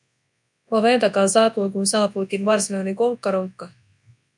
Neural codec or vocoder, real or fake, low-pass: codec, 24 kHz, 0.9 kbps, WavTokenizer, large speech release; fake; 10.8 kHz